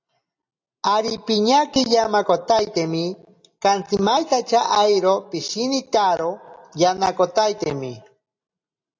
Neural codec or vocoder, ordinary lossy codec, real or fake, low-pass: none; AAC, 48 kbps; real; 7.2 kHz